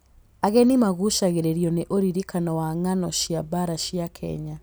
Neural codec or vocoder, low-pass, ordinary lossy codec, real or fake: none; none; none; real